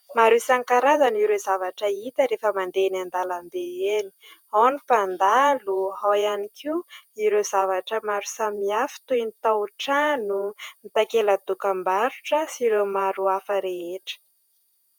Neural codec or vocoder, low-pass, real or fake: vocoder, 48 kHz, 128 mel bands, Vocos; 19.8 kHz; fake